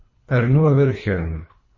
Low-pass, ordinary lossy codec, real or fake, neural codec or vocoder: 7.2 kHz; MP3, 32 kbps; fake; codec, 24 kHz, 3 kbps, HILCodec